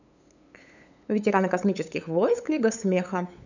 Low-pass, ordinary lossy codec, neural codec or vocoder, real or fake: 7.2 kHz; none; codec, 16 kHz, 8 kbps, FunCodec, trained on LibriTTS, 25 frames a second; fake